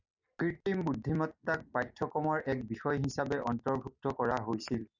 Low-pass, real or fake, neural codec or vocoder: 7.2 kHz; real; none